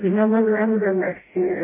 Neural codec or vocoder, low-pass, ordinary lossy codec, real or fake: codec, 16 kHz, 0.5 kbps, FreqCodec, smaller model; 3.6 kHz; MP3, 16 kbps; fake